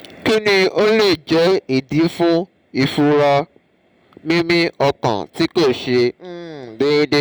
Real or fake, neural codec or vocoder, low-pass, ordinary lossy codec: real; none; none; none